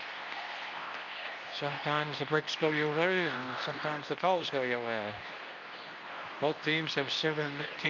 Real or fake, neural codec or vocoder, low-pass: fake; codec, 24 kHz, 0.9 kbps, WavTokenizer, medium speech release version 2; 7.2 kHz